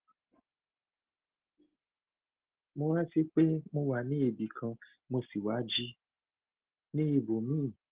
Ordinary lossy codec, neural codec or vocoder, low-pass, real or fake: Opus, 16 kbps; none; 3.6 kHz; real